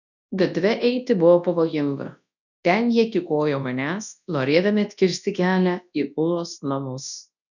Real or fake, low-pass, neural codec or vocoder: fake; 7.2 kHz; codec, 24 kHz, 0.9 kbps, WavTokenizer, large speech release